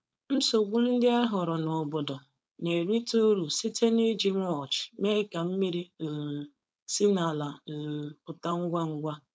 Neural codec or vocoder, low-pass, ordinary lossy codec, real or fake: codec, 16 kHz, 4.8 kbps, FACodec; none; none; fake